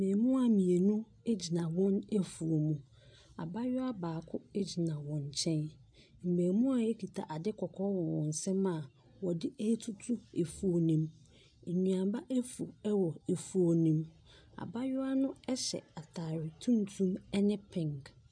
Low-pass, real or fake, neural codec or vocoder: 9.9 kHz; real; none